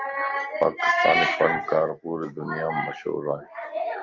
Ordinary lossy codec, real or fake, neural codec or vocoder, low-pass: Opus, 32 kbps; real; none; 7.2 kHz